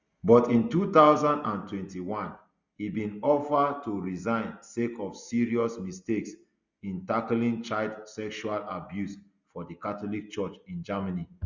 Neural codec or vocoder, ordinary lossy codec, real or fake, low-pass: none; Opus, 64 kbps; real; 7.2 kHz